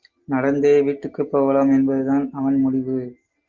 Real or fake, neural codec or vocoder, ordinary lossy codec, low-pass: real; none; Opus, 24 kbps; 7.2 kHz